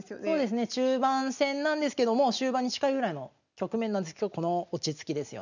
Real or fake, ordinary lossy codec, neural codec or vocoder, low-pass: real; none; none; 7.2 kHz